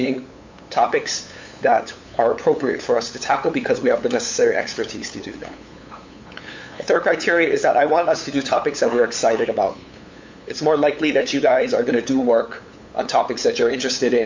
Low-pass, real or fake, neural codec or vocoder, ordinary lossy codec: 7.2 kHz; fake; codec, 16 kHz, 8 kbps, FunCodec, trained on LibriTTS, 25 frames a second; MP3, 48 kbps